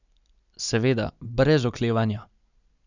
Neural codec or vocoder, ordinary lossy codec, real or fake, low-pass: none; none; real; 7.2 kHz